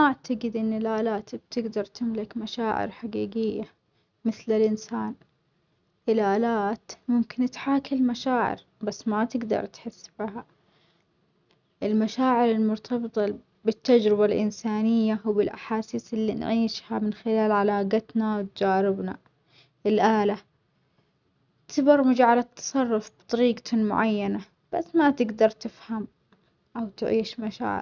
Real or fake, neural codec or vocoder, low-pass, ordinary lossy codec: real; none; 7.2 kHz; none